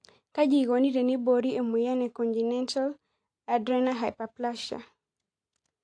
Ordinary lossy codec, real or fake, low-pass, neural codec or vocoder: AAC, 48 kbps; real; 9.9 kHz; none